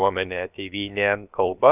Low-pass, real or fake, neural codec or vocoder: 3.6 kHz; fake; codec, 16 kHz, about 1 kbps, DyCAST, with the encoder's durations